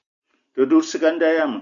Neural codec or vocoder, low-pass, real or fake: none; 7.2 kHz; real